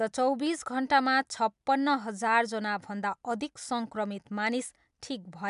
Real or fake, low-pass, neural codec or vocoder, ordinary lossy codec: real; 10.8 kHz; none; MP3, 96 kbps